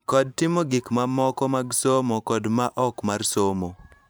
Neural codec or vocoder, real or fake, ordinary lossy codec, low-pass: vocoder, 44.1 kHz, 128 mel bands every 512 samples, BigVGAN v2; fake; none; none